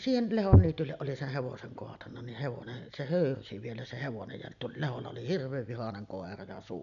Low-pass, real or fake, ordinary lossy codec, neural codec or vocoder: 7.2 kHz; real; none; none